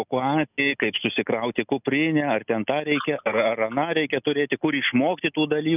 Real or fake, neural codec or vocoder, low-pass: real; none; 3.6 kHz